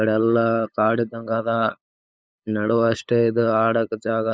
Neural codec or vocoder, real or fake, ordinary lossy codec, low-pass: codec, 16 kHz, 8 kbps, FunCodec, trained on LibriTTS, 25 frames a second; fake; none; none